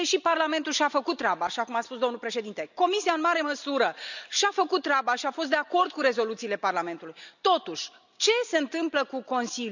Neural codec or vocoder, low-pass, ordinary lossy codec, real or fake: none; 7.2 kHz; none; real